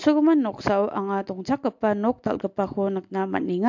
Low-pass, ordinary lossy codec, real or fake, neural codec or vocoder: 7.2 kHz; MP3, 48 kbps; real; none